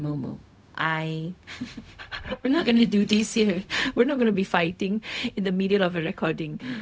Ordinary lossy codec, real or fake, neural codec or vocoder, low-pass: none; fake; codec, 16 kHz, 0.4 kbps, LongCat-Audio-Codec; none